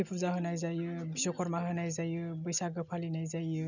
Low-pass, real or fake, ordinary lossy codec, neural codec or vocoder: 7.2 kHz; real; none; none